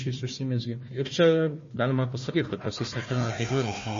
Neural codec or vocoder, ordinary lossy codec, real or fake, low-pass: codec, 16 kHz, 1 kbps, FunCodec, trained on Chinese and English, 50 frames a second; MP3, 32 kbps; fake; 7.2 kHz